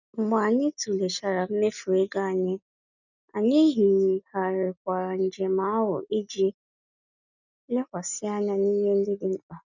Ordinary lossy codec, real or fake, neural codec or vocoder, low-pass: none; real; none; 7.2 kHz